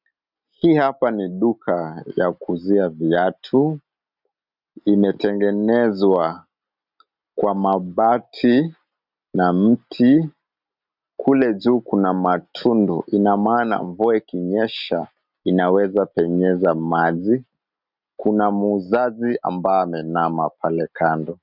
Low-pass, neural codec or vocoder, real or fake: 5.4 kHz; none; real